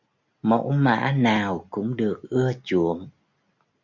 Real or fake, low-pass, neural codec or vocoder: real; 7.2 kHz; none